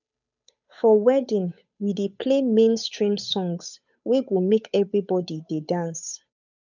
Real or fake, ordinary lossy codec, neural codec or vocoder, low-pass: fake; none; codec, 16 kHz, 8 kbps, FunCodec, trained on Chinese and English, 25 frames a second; 7.2 kHz